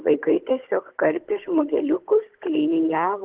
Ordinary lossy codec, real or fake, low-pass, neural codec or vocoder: Opus, 16 kbps; fake; 3.6 kHz; codec, 16 kHz, 16 kbps, FunCodec, trained on Chinese and English, 50 frames a second